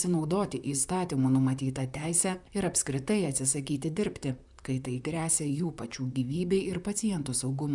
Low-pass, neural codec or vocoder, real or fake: 10.8 kHz; vocoder, 44.1 kHz, 128 mel bands, Pupu-Vocoder; fake